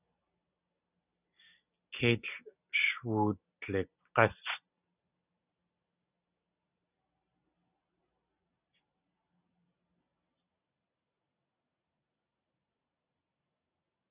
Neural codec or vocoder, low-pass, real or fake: none; 3.6 kHz; real